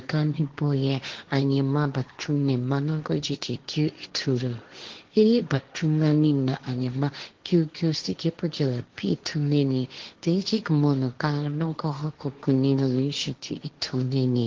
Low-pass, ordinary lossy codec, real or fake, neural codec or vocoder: 7.2 kHz; Opus, 16 kbps; fake; codec, 16 kHz, 1.1 kbps, Voila-Tokenizer